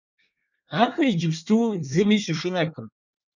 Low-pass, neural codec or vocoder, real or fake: 7.2 kHz; codec, 24 kHz, 1 kbps, SNAC; fake